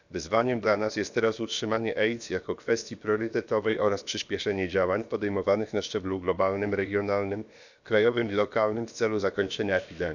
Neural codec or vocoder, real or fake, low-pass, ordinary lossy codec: codec, 16 kHz, about 1 kbps, DyCAST, with the encoder's durations; fake; 7.2 kHz; none